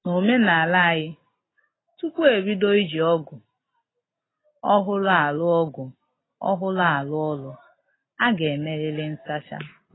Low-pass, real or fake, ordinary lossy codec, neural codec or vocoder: 7.2 kHz; real; AAC, 16 kbps; none